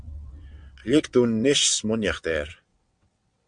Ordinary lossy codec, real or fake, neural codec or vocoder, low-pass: MP3, 64 kbps; fake; vocoder, 22.05 kHz, 80 mel bands, WaveNeXt; 9.9 kHz